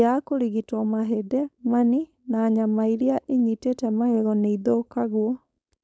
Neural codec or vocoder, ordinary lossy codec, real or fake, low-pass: codec, 16 kHz, 4.8 kbps, FACodec; none; fake; none